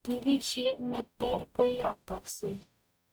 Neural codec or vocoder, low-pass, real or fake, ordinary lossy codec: codec, 44.1 kHz, 0.9 kbps, DAC; none; fake; none